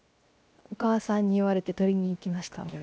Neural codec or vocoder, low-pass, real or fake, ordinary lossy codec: codec, 16 kHz, 0.7 kbps, FocalCodec; none; fake; none